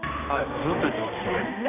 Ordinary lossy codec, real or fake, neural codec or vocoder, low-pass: none; fake; codec, 16 kHz, 2 kbps, X-Codec, HuBERT features, trained on balanced general audio; 3.6 kHz